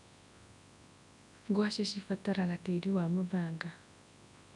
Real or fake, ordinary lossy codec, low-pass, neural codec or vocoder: fake; none; 10.8 kHz; codec, 24 kHz, 0.9 kbps, WavTokenizer, large speech release